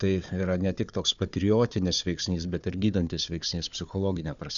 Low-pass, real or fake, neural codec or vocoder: 7.2 kHz; fake; codec, 16 kHz, 4 kbps, FunCodec, trained on Chinese and English, 50 frames a second